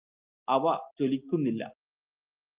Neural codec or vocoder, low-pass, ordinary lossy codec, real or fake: none; 3.6 kHz; Opus, 32 kbps; real